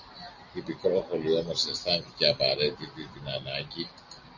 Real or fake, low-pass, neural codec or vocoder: real; 7.2 kHz; none